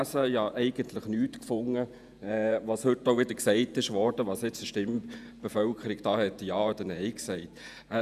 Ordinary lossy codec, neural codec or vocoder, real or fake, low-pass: none; vocoder, 48 kHz, 128 mel bands, Vocos; fake; 14.4 kHz